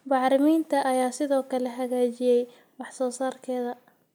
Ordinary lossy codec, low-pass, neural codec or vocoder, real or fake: none; none; none; real